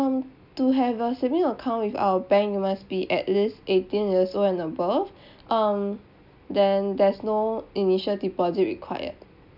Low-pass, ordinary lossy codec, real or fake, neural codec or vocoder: 5.4 kHz; none; real; none